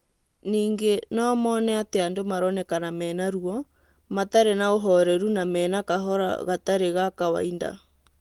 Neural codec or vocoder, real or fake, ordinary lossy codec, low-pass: none; real; Opus, 24 kbps; 19.8 kHz